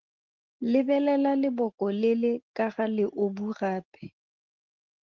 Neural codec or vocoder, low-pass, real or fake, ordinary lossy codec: none; 7.2 kHz; real; Opus, 16 kbps